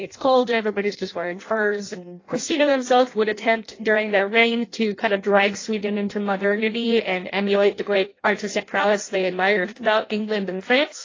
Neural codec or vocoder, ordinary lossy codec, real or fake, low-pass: codec, 16 kHz in and 24 kHz out, 0.6 kbps, FireRedTTS-2 codec; AAC, 32 kbps; fake; 7.2 kHz